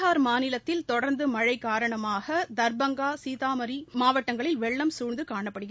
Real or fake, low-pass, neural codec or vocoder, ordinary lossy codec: real; none; none; none